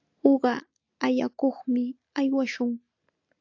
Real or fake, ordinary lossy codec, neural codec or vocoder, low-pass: real; AAC, 48 kbps; none; 7.2 kHz